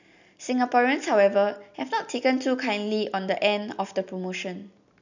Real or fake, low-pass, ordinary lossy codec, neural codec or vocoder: real; 7.2 kHz; none; none